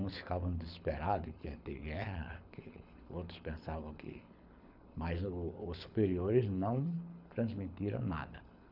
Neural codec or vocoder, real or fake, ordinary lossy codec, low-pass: codec, 24 kHz, 6 kbps, HILCodec; fake; none; 5.4 kHz